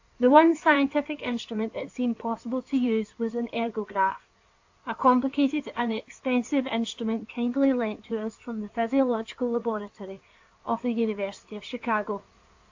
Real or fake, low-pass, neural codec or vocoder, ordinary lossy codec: fake; 7.2 kHz; codec, 16 kHz in and 24 kHz out, 2.2 kbps, FireRedTTS-2 codec; AAC, 48 kbps